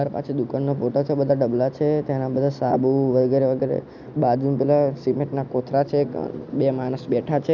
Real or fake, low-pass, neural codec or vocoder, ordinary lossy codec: real; 7.2 kHz; none; none